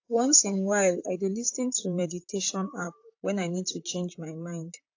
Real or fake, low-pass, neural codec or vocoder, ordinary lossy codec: fake; 7.2 kHz; vocoder, 44.1 kHz, 128 mel bands, Pupu-Vocoder; AAC, 48 kbps